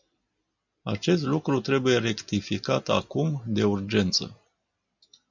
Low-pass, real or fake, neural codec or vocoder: 7.2 kHz; real; none